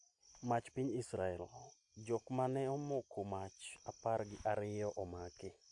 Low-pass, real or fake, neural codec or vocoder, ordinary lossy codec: 10.8 kHz; real; none; AAC, 64 kbps